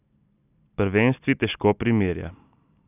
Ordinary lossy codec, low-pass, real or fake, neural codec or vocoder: none; 3.6 kHz; real; none